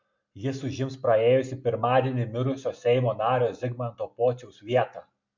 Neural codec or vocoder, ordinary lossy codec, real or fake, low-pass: none; MP3, 64 kbps; real; 7.2 kHz